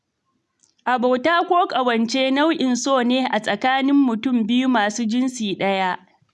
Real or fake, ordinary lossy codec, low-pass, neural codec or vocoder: real; none; none; none